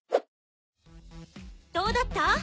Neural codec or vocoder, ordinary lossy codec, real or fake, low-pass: none; none; real; none